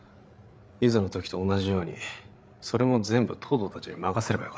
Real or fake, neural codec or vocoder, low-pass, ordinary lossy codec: fake; codec, 16 kHz, 8 kbps, FreqCodec, larger model; none; none